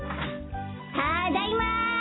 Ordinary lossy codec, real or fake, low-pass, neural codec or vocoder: AAC, 16 kbps; real; 7.2 kHz; none